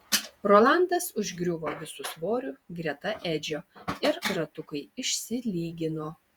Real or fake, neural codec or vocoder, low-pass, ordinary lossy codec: fake; vocoder, 48 kHz, 128 mel bands, Vocos; 19.8 kHz; Opus, 64 kbps